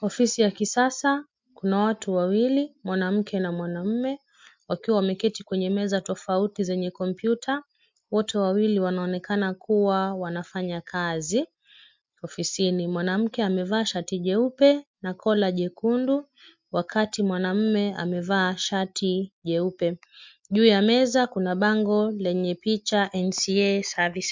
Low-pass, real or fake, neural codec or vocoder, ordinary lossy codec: 7.2 kHz; real; none; MP3, 64 kbps